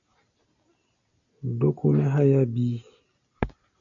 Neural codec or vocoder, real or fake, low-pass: none; real; 7.2 kHz